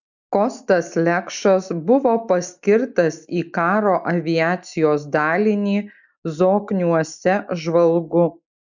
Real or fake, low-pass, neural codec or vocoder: real; 7.2 kHz; none